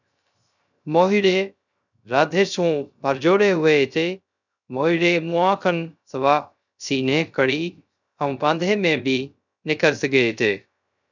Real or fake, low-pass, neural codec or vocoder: fake; 7.2 kHz; codec, 16 kHz, 0.3 kbps, FocalCodec